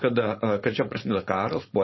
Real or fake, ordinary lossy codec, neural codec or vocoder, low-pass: fake; MP3, 24 kbps; codec, 16 kHz, 4.8 kbps, FACodec; 7.2 kHz